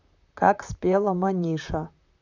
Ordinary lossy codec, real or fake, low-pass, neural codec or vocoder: none; fake; 7.2 kHz; vocoder, 44.1 kHz, 128 mel bands, Pupu-Vocoder